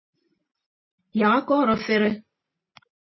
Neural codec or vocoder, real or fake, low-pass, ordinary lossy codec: none; real; 7.2 kHz; MP3, 24 kbps